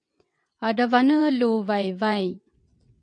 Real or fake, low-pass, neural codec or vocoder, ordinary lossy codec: fake; 9.9 kHz; vocoder, 22.05 kHz, 80 mel bands, WaveNeXt; MP3, 96 kbps